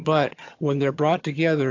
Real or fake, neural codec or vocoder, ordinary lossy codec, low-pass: fake; vocoder, 22.05 kHz, 80 mel bands, HiFi-GAN; AAC, 48 kbps; 7.2 kHz